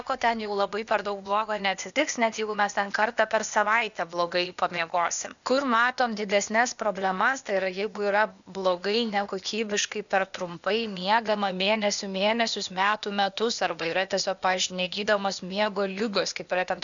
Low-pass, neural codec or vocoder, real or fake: 7.2 kHz; codec, 16 kHz, 0.8 kbps, ZipCodec; fake